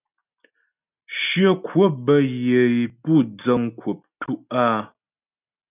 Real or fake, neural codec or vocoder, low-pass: real; none; 3.6 kHz